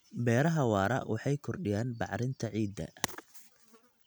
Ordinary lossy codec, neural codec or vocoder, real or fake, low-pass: none; none; real; none